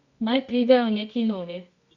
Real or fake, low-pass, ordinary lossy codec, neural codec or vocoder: fake; 7.2 kHz; Opus, 64 kbps; codec, 24 kHz, 0.9 kbps, WavTokenizer, medium music audio release